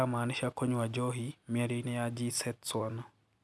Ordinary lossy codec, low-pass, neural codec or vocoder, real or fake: none; none; none; real